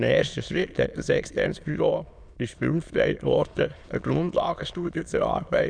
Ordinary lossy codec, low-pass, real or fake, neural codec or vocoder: none; 9.9 kHz; fake; autoencoder, 22.05 kHz, a latent of 192 numbers a frame, VITS, trained on many speakers